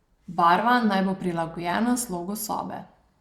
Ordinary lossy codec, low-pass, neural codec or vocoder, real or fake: Opus, 64 kbps; 19.8 kHz; vocoder, 44.1 kHz, 128 mel bands every 256 samples, BigVGAN v2; fake